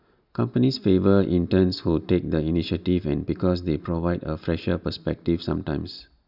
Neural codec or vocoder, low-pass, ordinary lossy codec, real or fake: none; 5.4 kHz; none; real